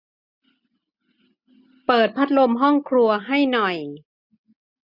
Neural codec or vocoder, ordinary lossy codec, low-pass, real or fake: none; none; 5.4 kHz; real